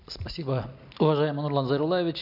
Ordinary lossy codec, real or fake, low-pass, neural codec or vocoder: none; real; 5.4 kHz; none